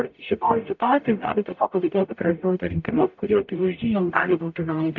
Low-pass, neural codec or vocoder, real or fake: 7.2 kHz; codec, 44.1 kHz, 0.9 kbps, DAC; fake